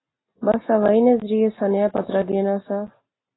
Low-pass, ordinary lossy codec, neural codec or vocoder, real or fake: 7.2 kHz; AAC, 16 kbps; none; real